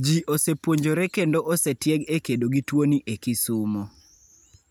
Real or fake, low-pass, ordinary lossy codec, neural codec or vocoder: real; none; none; none